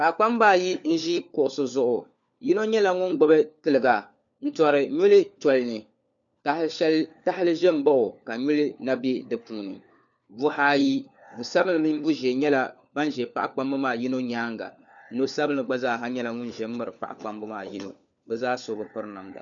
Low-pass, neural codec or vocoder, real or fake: 7.2 kHz; codec, 16 kHz, 4 kbps, FunCodec, trained on LibriTTS, 50 frames a second; fake